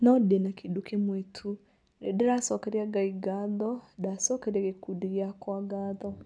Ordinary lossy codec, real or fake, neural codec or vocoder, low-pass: none; real; none; 9.9 kHz